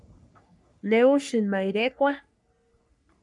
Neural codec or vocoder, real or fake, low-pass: codec, 44.1 kHz, 3.4 kbps, Pupu-Codec; fake; 10.8 kHz